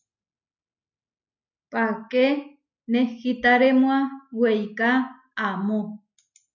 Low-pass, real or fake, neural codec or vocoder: 7.2 kHz; real; none